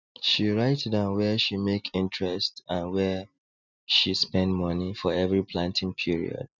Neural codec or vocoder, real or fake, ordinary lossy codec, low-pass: none; real; none; 7.2 kHz